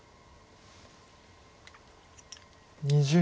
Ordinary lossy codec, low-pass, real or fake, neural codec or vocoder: none; none; real; none